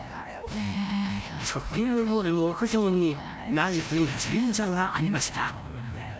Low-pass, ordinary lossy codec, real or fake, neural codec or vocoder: none; none; fake; codec, 16 kHz, 0.5 kbps, FreqCodec, larger model